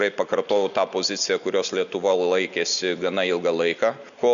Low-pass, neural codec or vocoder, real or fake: 7.2 kHz; none; real